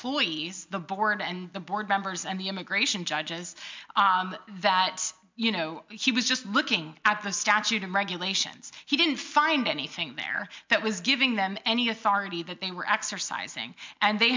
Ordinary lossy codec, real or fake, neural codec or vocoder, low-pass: MP3, 64 kbps; real; none; 7.2 kHz